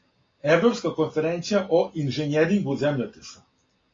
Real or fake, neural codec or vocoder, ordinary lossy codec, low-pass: real; none; AAC, 32 kbps; 7.2 kHz